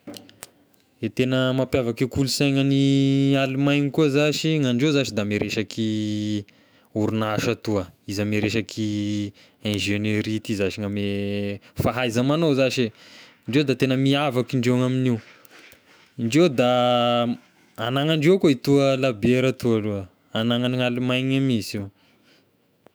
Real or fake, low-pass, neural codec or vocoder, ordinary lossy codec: fake; none; autoencoder, 48 kHz, 128 numbers a frame, DAC-VAE, trained on Japanese speech; none